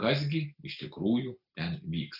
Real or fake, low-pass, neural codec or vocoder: real; 5.4 kHz; none